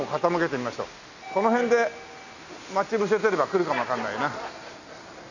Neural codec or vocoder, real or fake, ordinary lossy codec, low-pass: none; real; none; 7.2 kHz